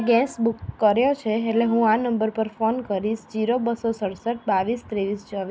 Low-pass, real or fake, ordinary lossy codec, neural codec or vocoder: none; real; none; none